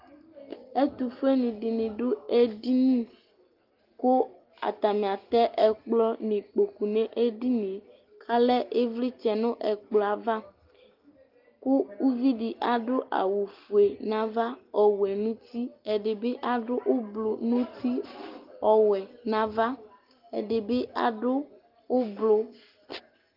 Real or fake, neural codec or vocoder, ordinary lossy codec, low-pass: real; none; Opus, 24 kbps; 5.4 kHz